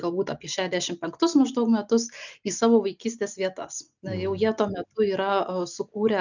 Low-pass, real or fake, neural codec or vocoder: 7.2 kHz; real; none